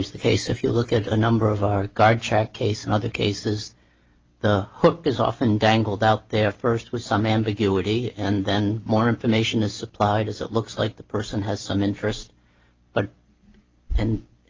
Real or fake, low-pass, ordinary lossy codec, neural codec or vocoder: real; 7.2 kHz; Opus, 24 kbps; none